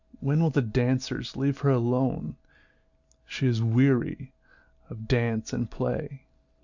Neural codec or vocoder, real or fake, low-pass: none; real; 7.2 kHz